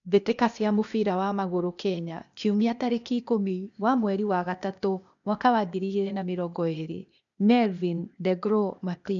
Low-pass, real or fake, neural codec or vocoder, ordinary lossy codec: 7.2 kHz; fake; codec, 16 kHz, 0.8 kbps, ZipCodec; none